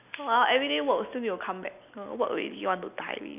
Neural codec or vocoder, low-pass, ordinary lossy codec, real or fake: none; 3.6 kHz; none; real